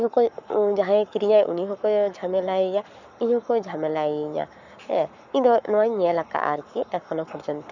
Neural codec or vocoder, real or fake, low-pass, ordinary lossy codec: codec, 44.1 kHz, 7.8 kbps, Pupu-Codec; fake; 7.2 kHz; none